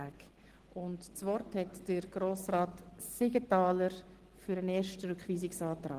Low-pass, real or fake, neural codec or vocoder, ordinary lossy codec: 14.4 kHz; real; none; Opus, 16 kbps